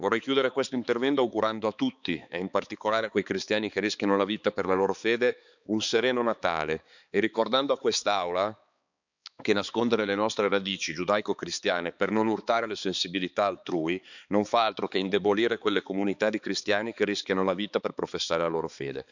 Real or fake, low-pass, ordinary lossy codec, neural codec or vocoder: fake; 7.2 kHz; none; codec, 16 kHz, 4 kbps, X-Codec, HuBERT features, trained on balanced general audio